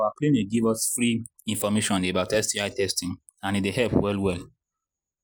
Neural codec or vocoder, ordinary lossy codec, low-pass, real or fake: none; none; none; real